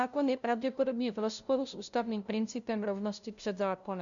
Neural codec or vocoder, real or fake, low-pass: codec, 16 kHz, 0.5 kbps, FunCodec, trained on LibriTTS, 25 frames a second; fake; 7.2 kHz